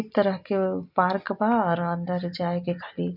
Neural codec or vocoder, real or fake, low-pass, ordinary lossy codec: none; real; 5.4 kHz; none